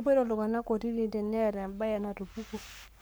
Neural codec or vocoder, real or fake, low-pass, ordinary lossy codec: codec, 44.1 kHz, 7.8 kbps, Pupu-Codec; fake; none; none